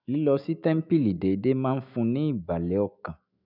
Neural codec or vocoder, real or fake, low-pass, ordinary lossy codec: autoencoder, 48 kHz, 128 numbers a frame, DAC-VAE, trained on Japanese speech; fake; 5.4 kHz; none